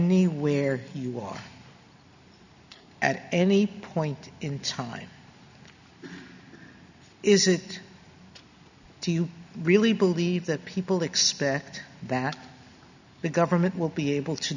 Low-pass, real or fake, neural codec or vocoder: 7.2 kHz; real; none